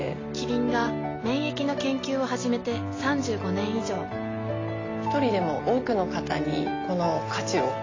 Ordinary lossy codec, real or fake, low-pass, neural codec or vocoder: AAC, 32 kbps; real; 7.2 kHz; none